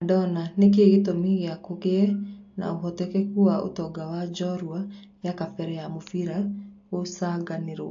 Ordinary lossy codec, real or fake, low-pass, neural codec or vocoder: AAC, 48 kbps; real; 7.2 kHz; none